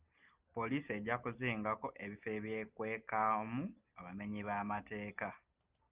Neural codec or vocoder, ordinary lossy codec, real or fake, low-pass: none; Opus, 24 kbps; real; 3.6 kHz